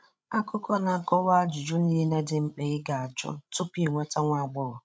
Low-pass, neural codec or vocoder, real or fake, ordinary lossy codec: none; codec, 16 kHz, 16 kbps, FreqCodec, larger model; fake; none